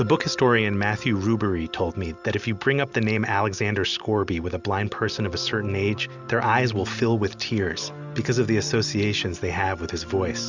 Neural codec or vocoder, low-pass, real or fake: none; 7.2 kHz; real